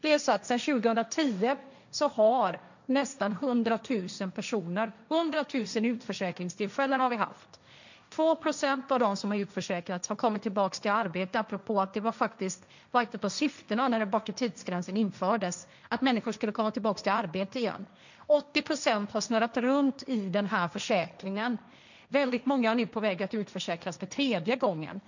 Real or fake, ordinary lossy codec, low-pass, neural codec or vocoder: fake; none; 7.2 kHz; codec, 16 kHz, 1.1 kbps, Voila-Tokenizer